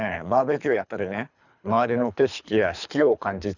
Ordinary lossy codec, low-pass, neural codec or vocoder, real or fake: none; 7.2 kHz; codec, 24 kHz, 3 kbps, HILCodec; fake